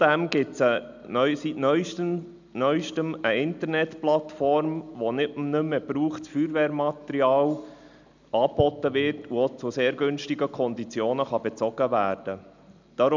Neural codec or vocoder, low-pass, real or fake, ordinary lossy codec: none; 7.2 kHz; real; none